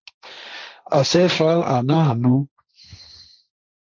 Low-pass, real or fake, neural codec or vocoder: 7.2 kHz; fake; codec, 16 kHz, 1.1 kbps, Voila-Tokenizer